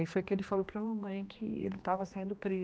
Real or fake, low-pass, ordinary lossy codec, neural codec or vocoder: fake; none; none; codec, 16 kHz, 2 kbps, X-Codec, HuBERT features, trained on general audio